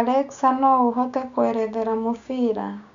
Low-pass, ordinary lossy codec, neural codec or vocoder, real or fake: 7.2 kHz; Opus, 64 kbps; codec, 16 kHz, 6 kbps, DAC; fake